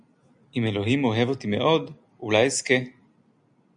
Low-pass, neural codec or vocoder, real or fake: 9.9 kHz; none; real